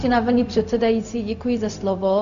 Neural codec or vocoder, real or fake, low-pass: codec, 16 kHz, 0.4 kbps, LongCat-Audio-Codec; fake; 7.2 kHz